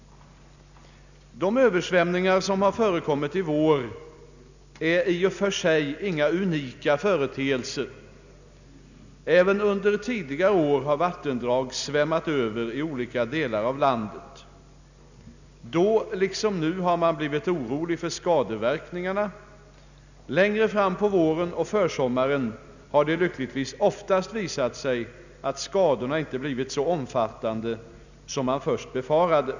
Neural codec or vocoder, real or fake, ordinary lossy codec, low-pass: none; real; none; 7.2 kHz